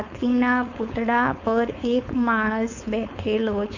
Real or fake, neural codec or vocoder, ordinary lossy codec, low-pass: fake; codec, 16 kHz, 4.8 kbps, FACodec; none; 7.2 kHz